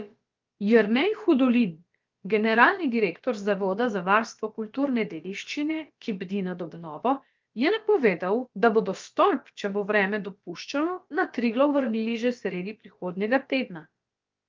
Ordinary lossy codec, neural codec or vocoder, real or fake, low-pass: Opus, 32 kbps; codec, 16 kHz, about 1 kbps, DyCAST, with the encoder's durations; fake; 7.2 kHz